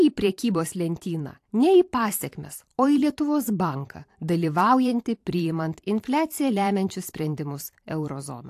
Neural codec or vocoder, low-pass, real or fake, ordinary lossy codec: vocoder, 44.1 kHz, 128 mel bands every 512 samples, BigVGAN v2; 14.4 kHz; fake; AAC, 64 kbps